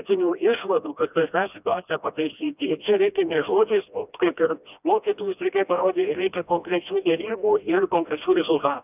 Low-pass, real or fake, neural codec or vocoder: 3.6 kHz; fake; codec, 16 kHz, 1 kbps, FreqCodec, smaller model